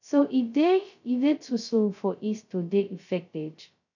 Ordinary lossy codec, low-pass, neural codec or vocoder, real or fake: none; 7.2 kHz; codec, 16 kHz, 0.2 kbps, FocalCodec; fake